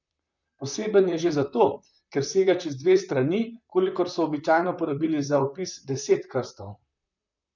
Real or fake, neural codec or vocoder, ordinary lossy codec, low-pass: fake; vocoder, 44.1 kHz, 128 mel bands, Pupu-Vocoder; none; 7.2 kHz